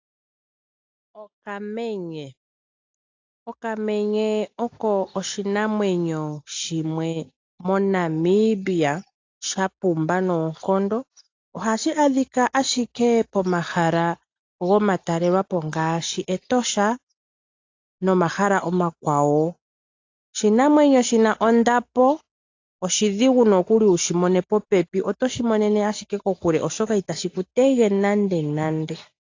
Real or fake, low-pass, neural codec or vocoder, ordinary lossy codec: real; 7.2 kHz; none; AAC, 48 kbps